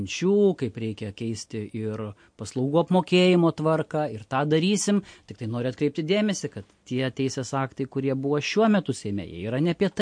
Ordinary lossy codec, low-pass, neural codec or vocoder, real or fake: MP3, 48 kbps; 9.9 kHz; none; real